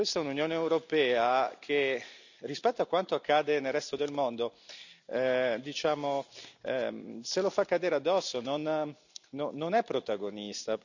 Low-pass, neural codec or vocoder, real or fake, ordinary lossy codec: 7.2 kHz; none; real; none